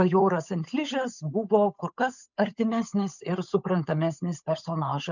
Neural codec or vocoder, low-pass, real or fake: codec, 16 kHz, 8 kbps, FunCodec, trained on Chinese and English, 25 frames a second; 7.2 kHz; fake